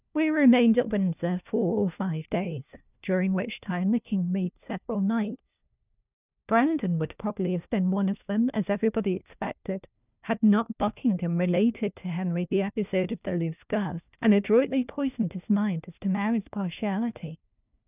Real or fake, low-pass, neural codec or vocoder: fake; 3.6 kHz; codec, 16 kHz, 1 kbps, FunCodec, trained on LibriTTS, 50 frames a second